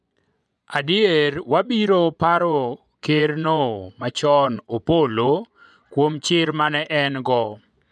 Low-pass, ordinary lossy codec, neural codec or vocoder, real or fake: none; none; vocoder, 24 kHz, 100 mel bands, Vocos; fake